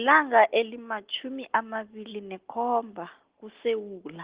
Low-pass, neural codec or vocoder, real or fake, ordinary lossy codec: 3.6 kHz; none; real; Opus, 16 kbps